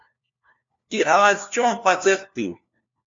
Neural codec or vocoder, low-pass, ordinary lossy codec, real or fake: codec, 16 kHz, 1 kbps, FunCodec, trained on LibriTTS, 50 frames a second; 7.2 kHz; MP3, 48 kbps; fake